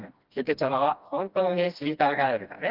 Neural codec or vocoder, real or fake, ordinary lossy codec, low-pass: codec, 16 kHz, 1 kbps, FreqCodec, smaller model; fake; Opus, 24 kbps; 5.4 kHz